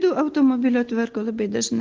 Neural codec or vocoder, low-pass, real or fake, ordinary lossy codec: none; 7.2 kHz; real; Opus, 16 kbps